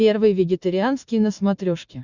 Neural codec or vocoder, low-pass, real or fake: none; 7.2 kHz; real